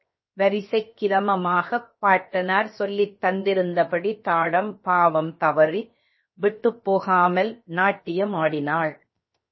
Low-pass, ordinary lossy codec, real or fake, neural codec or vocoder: 7.2 kHz; MP3, 24 kbps; fake; codec, 16 kHz, 0.7 kbps, FocalCodec